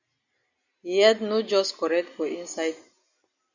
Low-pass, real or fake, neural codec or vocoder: 7.2 kHz; real; none